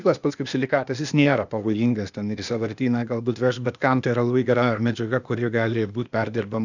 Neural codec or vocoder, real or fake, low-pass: codec, 16 kHz, 0.8 kbps, ZipCodec; fake; 7.2 kHz